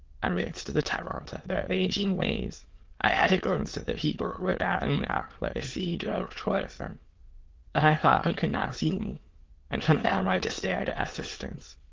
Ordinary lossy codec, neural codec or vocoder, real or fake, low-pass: Opus, 16 kbps; autoencoder, 22.05 kHz, a latent of 192 numbers a frame, VITS, trained on many speakers; fake; 7.2 kHz